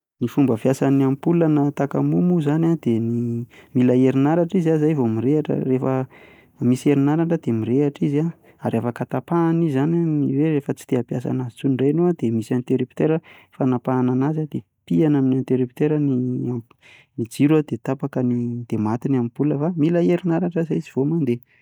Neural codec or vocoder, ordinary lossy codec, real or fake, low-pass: none; none; real; 19.8 kHz